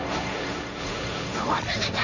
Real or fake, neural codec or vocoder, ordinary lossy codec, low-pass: fake; codec, 16 kHz, 1.1 kbps, Voila-Tokenizer; none; 7.2 kHz